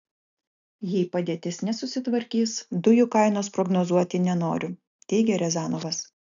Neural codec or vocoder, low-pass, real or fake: none; 7.2 kHz; real